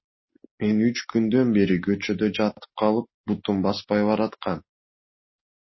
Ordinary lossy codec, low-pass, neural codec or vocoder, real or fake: MP3, 24 kbps; 7.2 kHz; none; real